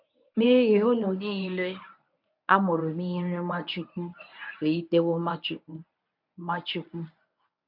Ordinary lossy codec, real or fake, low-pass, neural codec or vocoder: none; fake; 5.4 kHz; codec, 24 kHz, 0.9 kbps, WavTokenizer, medium speech release version 1